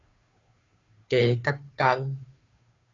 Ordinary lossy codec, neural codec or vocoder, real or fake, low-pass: AAC, 32 kbps; codec, 16 kHz, 2 kbps, FunCodec, trained on Chinese and English, 25 frames a second; fake; 7.2 kHz